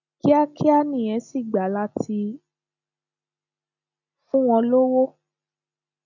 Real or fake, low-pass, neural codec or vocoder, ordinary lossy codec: real; 7.2 kHz; none; none